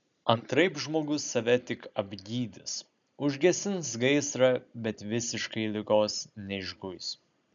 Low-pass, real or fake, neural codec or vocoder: 7.2 kHz; real; none